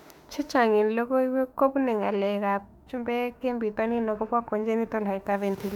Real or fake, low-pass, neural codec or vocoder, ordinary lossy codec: fake; 19.8 kHz; autoencoder, 48 kHz, 32 numbers a frame, DAC-VAE, trained on Japanese speech; none